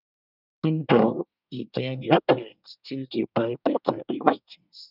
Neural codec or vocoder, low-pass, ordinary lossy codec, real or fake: codec, 24 kHz, 1 kbps, SNAC; 5.4 kHz; none; fake